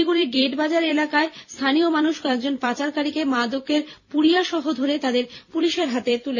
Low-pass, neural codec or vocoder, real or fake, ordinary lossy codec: 7.2 kHz; vocoder, 44.1 kHz, 128 mel bands every 256 samples, BigVGAN v2; fake; none